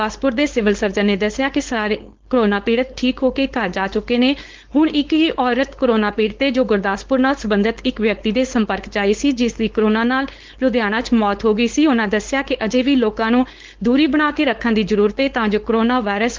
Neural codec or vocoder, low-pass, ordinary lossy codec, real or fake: codec, 16 kHz, 4.8 kbps, FACodec; 7.2 kHz; Opus, 16 kbps; fake